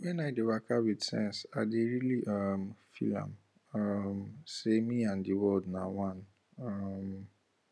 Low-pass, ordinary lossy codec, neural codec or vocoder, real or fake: none; none; none; real